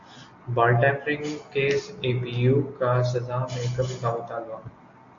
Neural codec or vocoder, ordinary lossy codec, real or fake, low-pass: none; AAC, 48 kbps; real; 7.2 kHz